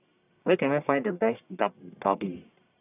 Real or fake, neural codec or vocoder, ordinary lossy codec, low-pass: fake; codec, 44.1 kHz, 1.7 kbps, Pupu-Codec; none; 3.6 kHz